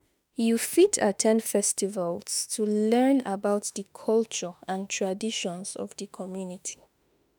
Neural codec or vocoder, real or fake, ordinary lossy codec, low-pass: autoencoder, 48 kHz, 32 numbers a frame, DAC-VAE, trained on Japanese speech; fake; none; none